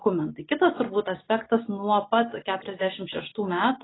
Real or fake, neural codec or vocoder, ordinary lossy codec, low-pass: real; none; AAC, 16 kbps; 7.2 kHz